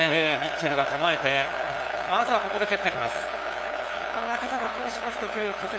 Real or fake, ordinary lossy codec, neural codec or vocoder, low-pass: fake; none; codec, 16 kHz, 2 kbps, FunCodec, trained on LibriTTS, 25 frames a second; none